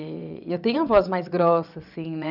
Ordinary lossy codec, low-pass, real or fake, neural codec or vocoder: none; 5.4 kHz; fake; vocoder, 22.05 kHz, 80 mel bands, WaveNeXt